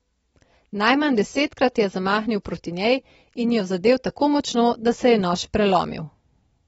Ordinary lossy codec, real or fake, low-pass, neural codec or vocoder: AAC, 24 kbps; real; 19.8 kHz; none